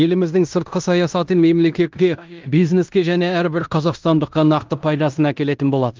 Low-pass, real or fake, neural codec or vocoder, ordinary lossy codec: 7.2 kHz; fake; codec, 16 kHz in and 24 kHz out, 0.9 kbps, LongCat-Audio-Codec, fine tuned four codebook decoder; Opus, 24 kbps